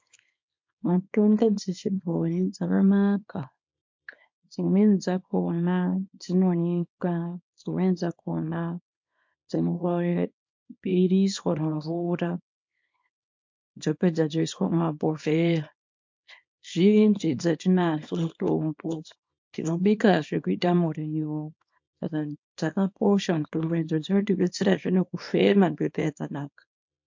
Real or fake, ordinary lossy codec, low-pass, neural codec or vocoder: fake; MP3, 48 kbps; 7.2 kHz; codec, 24 kHz, 0.9 kbps, WavTokenizer, small release